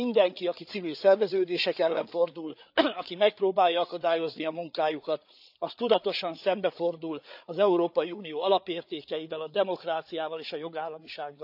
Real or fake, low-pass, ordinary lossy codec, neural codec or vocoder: fake; 5.4 kHz; none; codec, 16 kHz, 8 kbps, FreqCodec, larger model